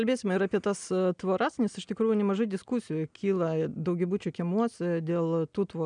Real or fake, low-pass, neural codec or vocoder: real; 9.9 kHz; none